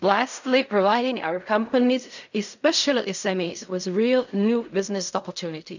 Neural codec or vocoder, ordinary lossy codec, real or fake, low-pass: codec, 16 kHz in and 24 kHz out, 0.4 kbps, LongCat-Audio-Codec, fine tuned four codebook decoder; none; fake; 7.2 kHz